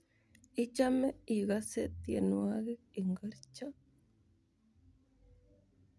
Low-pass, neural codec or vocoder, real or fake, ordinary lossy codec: none; none; real; none